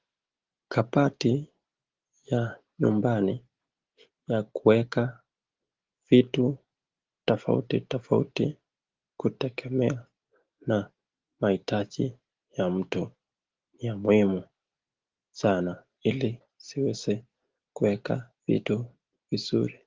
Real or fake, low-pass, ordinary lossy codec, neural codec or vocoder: real; 7.2 kHz; Opus, 16 kbps; none